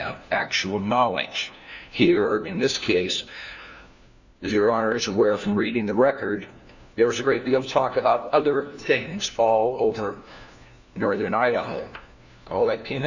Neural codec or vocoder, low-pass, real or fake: codec, 16 kHz, 1 kbps, FunCodec, trained on LibriTTS, 50 frames a second; 7.2 kHz; fake